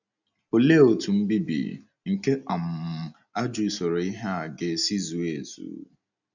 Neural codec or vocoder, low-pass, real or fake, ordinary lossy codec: none; 7.2 kHz; real; none